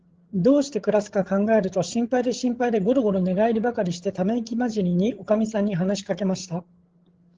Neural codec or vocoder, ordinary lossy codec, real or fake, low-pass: codec, 16 kHz, 16 kbps, FreqCodec, larger model; Opus, 16 kbps; fake; 7.2 kHz